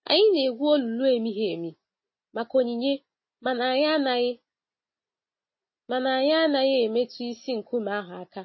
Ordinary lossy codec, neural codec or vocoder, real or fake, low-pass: MP3, 24 kbps; none; real; 7.2 kHz